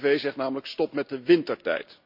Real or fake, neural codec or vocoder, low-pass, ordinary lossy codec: real; none; 5.4 kHz; AAC, 48 kbps